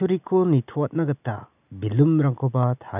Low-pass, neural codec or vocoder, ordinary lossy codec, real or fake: 3.6 kHz; none; none; real